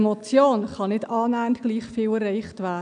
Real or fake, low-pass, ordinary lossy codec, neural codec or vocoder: real; 9.9 kHz; none; none